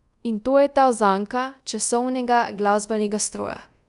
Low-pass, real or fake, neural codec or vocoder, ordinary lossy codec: 10.8 kHz; fake; codec, 24 kHz, 0.5 kbps, DualCodec; none